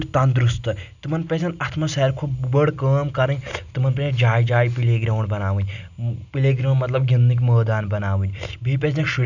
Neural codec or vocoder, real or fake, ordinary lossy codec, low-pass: none; real; none; 7.2 kHz